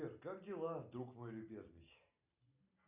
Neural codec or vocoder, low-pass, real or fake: none; 3.6 kHz; real